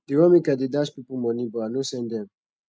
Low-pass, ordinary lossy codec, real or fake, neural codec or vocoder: none; none; real; none